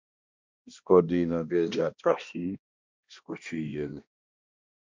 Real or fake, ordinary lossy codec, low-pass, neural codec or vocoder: fake; MP3, 64 kbps; 7.2 kHz; codec, 16 kHz, 1 kbps, X-Codec, HuBERT features, trained on balanced general audio